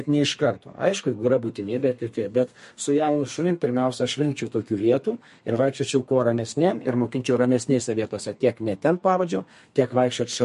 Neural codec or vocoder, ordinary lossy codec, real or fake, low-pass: codec, 32 kHz, 1.9 kbps, SNAC; MP3, 48 kbps; fake; 14.4 kHz